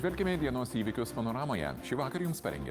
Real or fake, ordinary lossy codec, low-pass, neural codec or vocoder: real; Opus, 32 kbps; 14.4 kHz; none